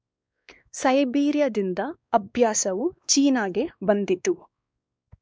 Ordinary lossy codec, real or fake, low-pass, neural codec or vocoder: none; fake; none; codec, 16 kHz, 2 kbps, X-Codec, WavLM features, trained on Multilingual LibriSpeech